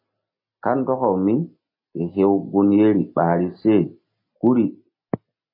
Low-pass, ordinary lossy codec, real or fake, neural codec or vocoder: 5.4 kHz; MP3, 24 kbps; real; none